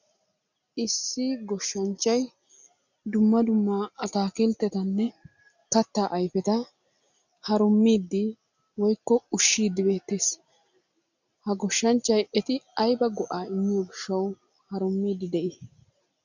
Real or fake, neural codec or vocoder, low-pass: real; none; 7.2 kHz